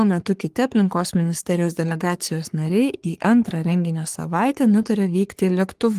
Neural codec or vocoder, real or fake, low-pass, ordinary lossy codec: codec, 44.1 kHz, 3.4 kbps, Pupu-Codec; fake; 14.4 kHz; Opus, 24 kbps